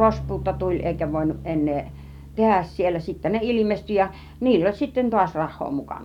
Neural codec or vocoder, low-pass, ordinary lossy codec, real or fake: none; 19.8 kHz; none; real